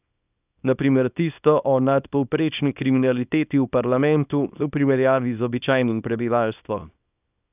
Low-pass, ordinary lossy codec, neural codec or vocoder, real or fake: 3.6 kHz; none; codec, 24 kHz, 0.9 kbps, WavTokenizer, small release; fake